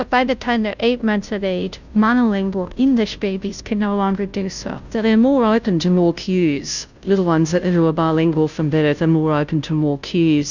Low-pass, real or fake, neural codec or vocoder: 7.2 kHz; fake; codec, 16 kHz, 0.5 kbps, FunCodec, trained on Chinese and English, 25 frames a second